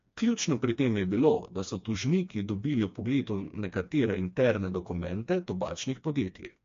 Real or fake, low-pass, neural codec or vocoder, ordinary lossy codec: fake; 7.2 kHz; codec, 16 kHz, 2 kbps, FreqCodec, smaller model; MP3, 48 kbps